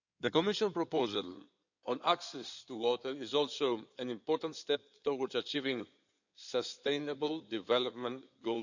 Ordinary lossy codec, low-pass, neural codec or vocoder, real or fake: none; 7.2 kHz; codec, 16 kHz in and 24 kHz out, 2.2 kbps, FireRedTTS-2 codec; fake